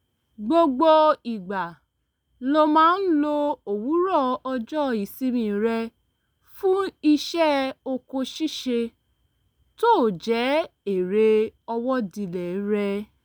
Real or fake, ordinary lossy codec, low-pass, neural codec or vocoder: real; none; none; none